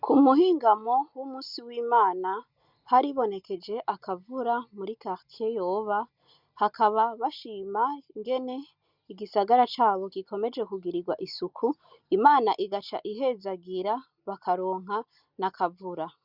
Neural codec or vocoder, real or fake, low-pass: none; real; 5.4 kHz